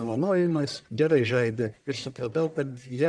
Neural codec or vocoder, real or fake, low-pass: codec, 44.1 kHz, 1.7 kbps, Pupu-Codec; fake; 9.9 kHz